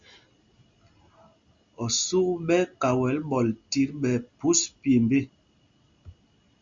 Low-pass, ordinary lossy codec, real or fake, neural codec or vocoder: 7.2 kHz; Opus, 64 kbps; real; none